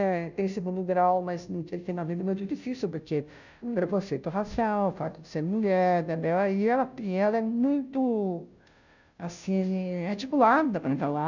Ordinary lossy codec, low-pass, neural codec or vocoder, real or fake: none; 7.2 kHz; codec, 16 kHz, 0.5 kbps, FunCodec, trained on Chinese and English, 25 frames a second; fake